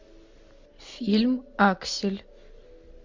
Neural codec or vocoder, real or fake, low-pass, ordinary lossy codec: none; real; 7.2 kHz; MP3, 48 kbps